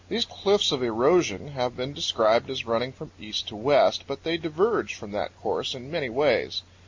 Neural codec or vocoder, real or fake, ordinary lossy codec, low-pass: none; real; MP3, 48 kbps; 7.2 kHz